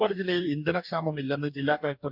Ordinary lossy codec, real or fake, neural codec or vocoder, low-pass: none; fake; codec, 44.1 kHz, 2.6 kbps, DAC; 5.4 kHz